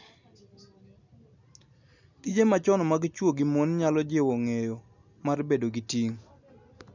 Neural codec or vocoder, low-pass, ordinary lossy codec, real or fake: none; 7.2 kHz; none; real